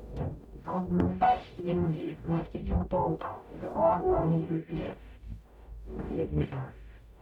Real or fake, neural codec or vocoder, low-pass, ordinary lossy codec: fake; codec, 44.1 kHz, 0.9 kbps, DAC; 19.8 kHz; none